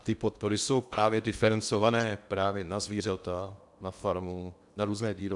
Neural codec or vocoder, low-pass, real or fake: codec, 16 kHz in and 24 kHz out, 0.8 kbps, FocalCodec, streaming, 65536 codes; 10.8 kHz; fake